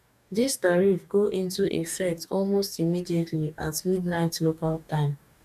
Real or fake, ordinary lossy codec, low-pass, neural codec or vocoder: fake; none; 14.4 kHz; codec, 44.1 kHz, 2.6 kbps, DAC